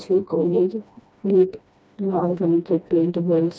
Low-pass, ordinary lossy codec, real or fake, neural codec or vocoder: none; none; fake; codec, 16 kHz, 1 kbps, FreqCodec, smaller model